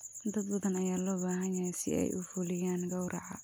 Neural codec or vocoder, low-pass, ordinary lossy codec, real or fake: none; none; none; real